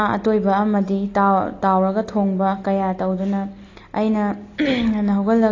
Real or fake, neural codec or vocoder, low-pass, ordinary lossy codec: real; none; 7.2 kHz; AAC, 32 kbps